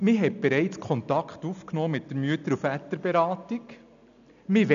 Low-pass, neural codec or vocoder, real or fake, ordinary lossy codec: 7.2 kHz; none; real; none